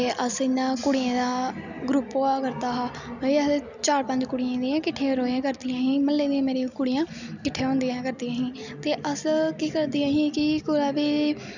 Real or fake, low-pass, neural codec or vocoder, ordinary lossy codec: real; 7.2 kHz; none; none